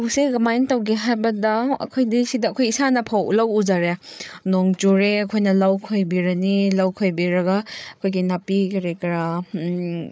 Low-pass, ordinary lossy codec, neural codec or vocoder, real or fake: none; none; codec, 16 kHz, 8 kbps, FreqCodec, larger model; fake